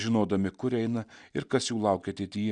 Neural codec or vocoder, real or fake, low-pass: none; real; 9.9 kHz